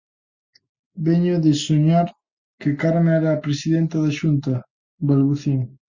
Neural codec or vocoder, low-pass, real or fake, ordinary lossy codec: none; 7.2 kHz; real; Opus, 64 kbps